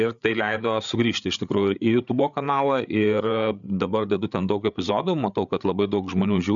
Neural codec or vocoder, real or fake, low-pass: codec, 16 kHz, 8 kbps, FreqCodec, larger model; fake; 7.2 kHz